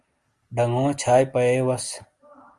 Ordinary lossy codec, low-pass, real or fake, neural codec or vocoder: Opus, 32 kbps; 10.8 kHz; real; none